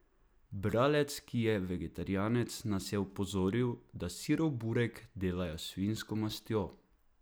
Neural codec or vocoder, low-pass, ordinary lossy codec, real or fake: none; none; none; real